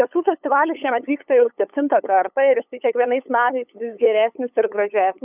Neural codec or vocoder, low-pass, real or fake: codec, 16 kHz, 8 kbps, FunCodec, trained on LibriTTS, 25 frames a second; 3.6 kHz; fake